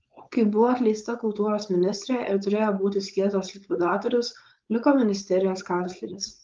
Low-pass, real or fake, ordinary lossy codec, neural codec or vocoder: 7.2 kHz; fake; Opus, 32 kbps; codec, 16 kHz, 4.8 kbps, FACodec